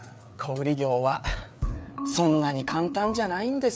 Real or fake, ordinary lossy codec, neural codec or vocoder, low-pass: fake; none; codec, 16 kHz, 4 kbps, FreqCodec, larger model; none